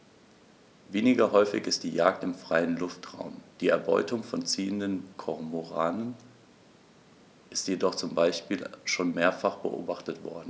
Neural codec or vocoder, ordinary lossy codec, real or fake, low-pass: none; none; real; none